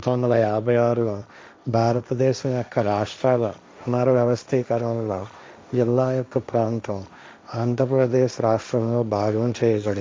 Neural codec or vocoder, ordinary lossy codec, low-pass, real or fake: codec, 16 kHz, 1.1 kbps, Voila-Tokenizer; none; none; fake